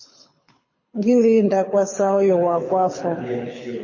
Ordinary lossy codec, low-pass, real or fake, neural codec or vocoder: MP3, 32 kbps; 7.2 kHz; fake; codec, 24 kHz, 6 kbps, HILCodec